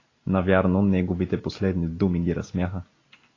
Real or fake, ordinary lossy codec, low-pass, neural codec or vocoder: real; AAC, 32 kbps; 7.2 kHz; none